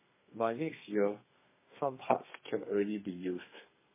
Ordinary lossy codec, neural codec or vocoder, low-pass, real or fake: MP3, 16 kbps; codec, 32 kHz, 1.9 kbps, SNAC; 3.6 kHz; fake